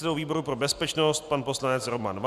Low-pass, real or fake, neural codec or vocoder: 14.4 kHz; real; none